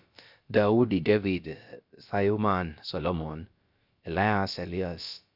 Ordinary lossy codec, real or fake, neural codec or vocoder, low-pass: Opus, 64 kbps; fake; codec, 16 kHz, about 1 kbps, DyCAST, with the encoder's durations; 5.4 kHz